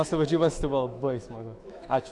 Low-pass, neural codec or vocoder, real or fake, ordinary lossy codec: 10.8 kHz; autoencoder, 48 kHz, 128 numbers a frame, DAC-VAE, trained on Japanese speech; fake; MP3, 96 kbps